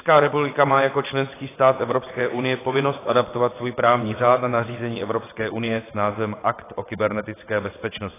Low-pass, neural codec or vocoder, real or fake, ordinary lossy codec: 3.6 kHz; vocoder, 22.05 kHz, 80 mel bands, WaveNeXt; fake; AAC, 16 kbps